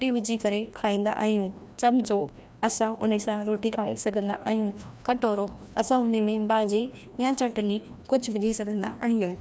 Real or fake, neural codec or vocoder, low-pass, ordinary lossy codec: fake; codec, 16 kHz, 1 kbps, FreqCodec, larger model; none; none